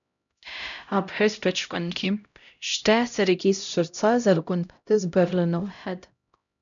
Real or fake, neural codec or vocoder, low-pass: fake; codec, 16 kHz, 0.5 kbps, X-Codec, HuBERT features, trained on LibriSpeech; 7.2 kHz